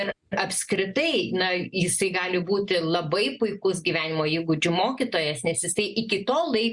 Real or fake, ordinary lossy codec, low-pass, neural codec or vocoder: real; Opus, 64 kbps; 10.8 kHz; none